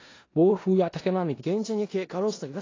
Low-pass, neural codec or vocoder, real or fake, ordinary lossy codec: 7.2 kHz; codec, 16 kHz in and 24 kHz out, 0.4 kbps, LongCat-Audio-Codec, four codebook decoder; fake; AAC, 32 kbps